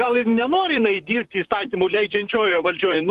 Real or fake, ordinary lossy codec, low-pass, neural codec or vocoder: fake; Opus, 24 kbps; 14.4 kHz; vocoder, 44.1 kHz, 128 mel bands, Pupu-Vocoder